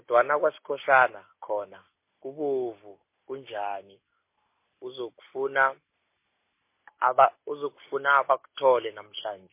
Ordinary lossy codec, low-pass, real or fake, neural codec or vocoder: MP3, 24 kbps; 3.6 kHz; real; none